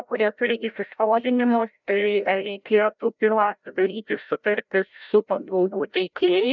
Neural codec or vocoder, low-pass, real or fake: codec, 16 kHz, 0.5 kbps, FreqCodec, larger model; 7.2 kHz; fake